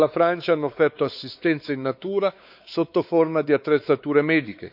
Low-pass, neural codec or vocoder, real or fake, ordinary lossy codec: 5.4 kHz; codec, 16 kHz, 4 kbps, X-Codec, WavLM features, trained on Multilingual LibriSpeech; fake; none